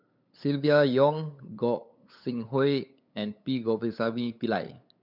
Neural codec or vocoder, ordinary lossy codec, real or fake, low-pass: codec, 16 kHz, 8 kbps, FunCodec, trained on LibriTTS, 25 frames a second; none; fake; 5.4 kHz